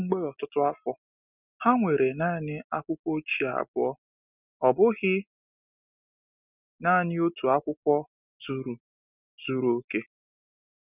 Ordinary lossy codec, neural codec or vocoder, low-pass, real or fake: none; none; 3.6 kHz; real